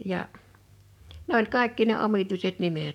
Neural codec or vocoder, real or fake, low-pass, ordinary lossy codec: codec, 44.1 kHz, 7.8 kbps, DAC; fake; 19.8 kHz; none